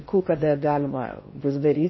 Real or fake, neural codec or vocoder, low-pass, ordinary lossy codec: fake; codec, 16 kHz in and 24 kHz out, 0.6 kbps, FocalCodec, streaming, 4096 codes; 7.2 kHz; MP3, 24 kbps